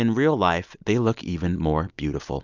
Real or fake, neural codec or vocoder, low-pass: real; none; 7.2 kHz